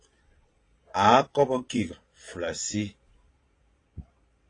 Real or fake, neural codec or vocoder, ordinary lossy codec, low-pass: fake; vocoder, 22.05 kHz, 80 mel bands, Vocos; AAC, 32 kbps; 9.9 kHz